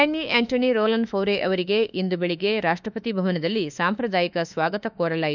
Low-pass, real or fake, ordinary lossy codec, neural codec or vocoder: 7.2 kHz; fake; none; autoencoder, 48 kHz, 32 numbers a frame, DAC-VAE, trained on Japanese speech